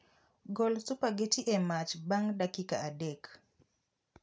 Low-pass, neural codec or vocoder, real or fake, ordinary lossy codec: none; none; real; none